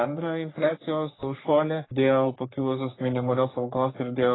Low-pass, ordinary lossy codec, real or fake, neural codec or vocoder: 7.2 kHz; AAC, 16 kbps; fake; codec, 44.1 kHz, 3.4 kbps, Pupu-Codec